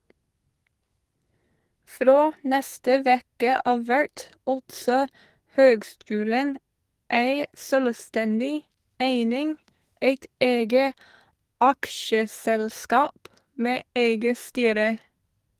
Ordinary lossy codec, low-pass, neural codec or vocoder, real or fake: Opus, 24 kbps; 14.4 kHz; codec, 44.1 kHz, 2.6 kbps, SNAC; fake